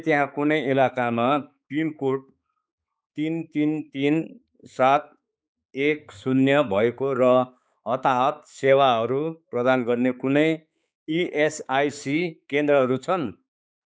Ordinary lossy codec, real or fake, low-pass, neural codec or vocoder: none; fake; none; codec, 16 kHz, 4 kbps, X-Codec, HuBERT features, trained on balanced general audio